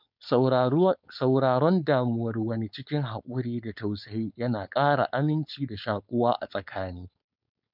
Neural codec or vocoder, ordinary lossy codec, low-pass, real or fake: codec, 16 kHz, 4.8 kbps, FACodec; none; 5.4 kHz; fake